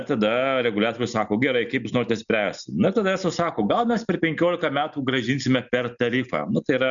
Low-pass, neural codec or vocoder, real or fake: 7.2 kHz; none; real